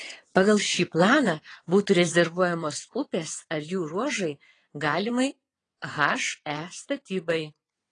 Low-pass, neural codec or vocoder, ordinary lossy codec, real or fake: 9.9 kHz; vocoder, 22.05 kHz, 80 mel bands, WaveNeXt; AAC, 32 kbps; fake